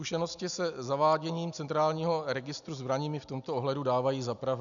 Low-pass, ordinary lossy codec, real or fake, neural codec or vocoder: 7.2 kHz; MP3, 96 kbps; real; none